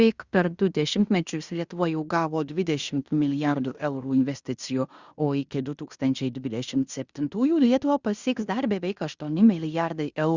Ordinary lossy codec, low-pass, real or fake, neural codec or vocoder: Opus, 64 kbps; 7.2 kHz; fake; codec, 16 kHz in and 24 kHz out, 0.9 kbps, LongCat-Audio-Codec, fine tuned four codebook decoder